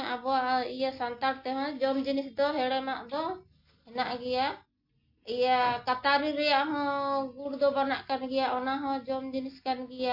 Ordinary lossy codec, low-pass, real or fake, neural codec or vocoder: MP3, 32 kbps; 5.4 kHz; real; none